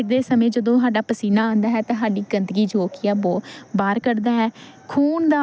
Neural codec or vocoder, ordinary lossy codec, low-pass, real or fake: none; none; none; real